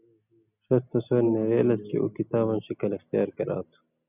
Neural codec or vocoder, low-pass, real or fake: none; 3.6 kHz; real